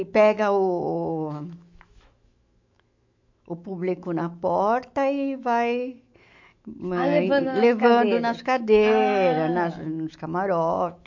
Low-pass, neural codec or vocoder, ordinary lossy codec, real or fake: 7.2 kHz; autoencoder, 48 kHz, 128 numbers a frame, DAC-VAE, trained on Japanese speech; MP3, 48 kbps; fake